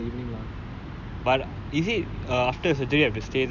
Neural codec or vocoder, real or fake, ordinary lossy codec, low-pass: none; real; none; 7.2 kHz